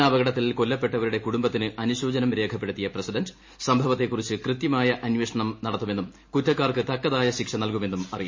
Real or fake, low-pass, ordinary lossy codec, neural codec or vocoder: real; 7.2 kHz; MP3, 32 kbps; none